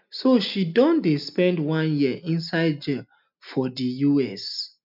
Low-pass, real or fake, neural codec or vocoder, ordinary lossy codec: 5.4 kHz; real; none; none